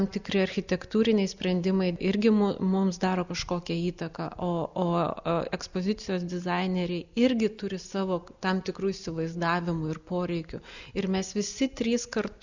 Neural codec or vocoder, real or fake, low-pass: none; real; 7.2 kHz